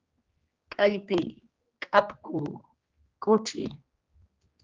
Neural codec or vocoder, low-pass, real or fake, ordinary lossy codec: codec, 16 kHz, 2 kbps, X-Codec, HuBERT features, trained on general audio; 7.2 kHz; fake; Opus, 24 kbps